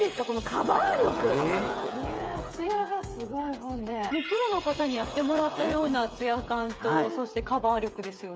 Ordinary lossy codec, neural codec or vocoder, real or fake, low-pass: none; codec, 16 kHz, 8 kbps, FreqCodec, smaller model; fake; none